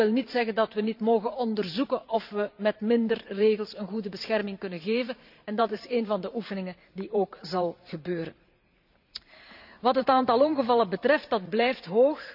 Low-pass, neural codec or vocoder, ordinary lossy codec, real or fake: 5.4 kHz; vocoder, 44.1 kHz, 128 mel bands every 512 samples, BigVGAN v2; none; fake